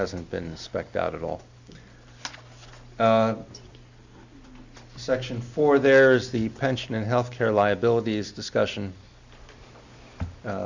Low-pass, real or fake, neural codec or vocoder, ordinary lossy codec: 7.2 kHz; real; none; Opus, 64 kbps